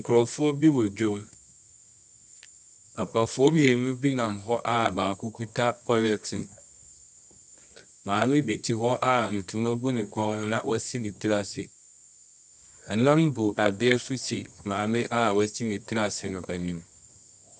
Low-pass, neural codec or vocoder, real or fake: 10.8 kHz; codec, 24 kHz, 0.9 kbps, WavTokenizer, medium music audio release; fake